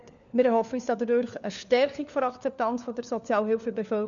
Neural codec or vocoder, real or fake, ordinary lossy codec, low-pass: codec, 16 kHz, 4 kbps, FunCodec, trained on LibriTTS, 50 frames a second; fake; none; 7.2 kHz